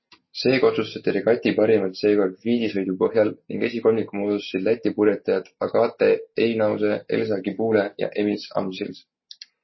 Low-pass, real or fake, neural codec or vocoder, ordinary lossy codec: 7.2 kHz; real; none; MP3, 24 kbps